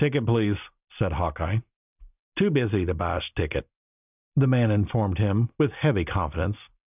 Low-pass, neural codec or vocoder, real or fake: 3.6 kHz; none; real